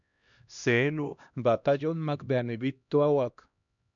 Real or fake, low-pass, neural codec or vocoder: fake; 7.2 kHz; codec, 16 kHz, 1 kbps, X-Codec, HuBERT features, trained on LibriSpeech